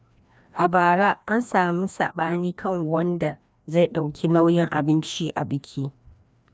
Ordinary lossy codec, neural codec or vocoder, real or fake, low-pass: none; codec, 16 kHz, 1 kbps, FreqCodec, larger model; fake; none